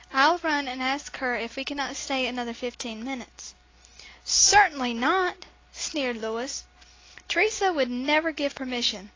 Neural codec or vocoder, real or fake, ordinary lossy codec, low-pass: none; real; AAC, 32 kbps; 7.2 kHz